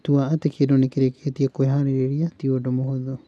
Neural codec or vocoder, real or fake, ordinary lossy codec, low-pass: none; real; none; none